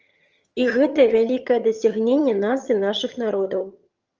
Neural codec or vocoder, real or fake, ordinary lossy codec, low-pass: vocoder, 22.05 kHz, 80 mel bands, HiFi-GAN; fake; Opus, 24 kbps; 7.2 kHz